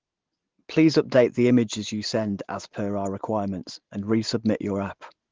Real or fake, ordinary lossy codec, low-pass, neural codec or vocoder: real; Opus, 16 kbps; 7.2 kHz; none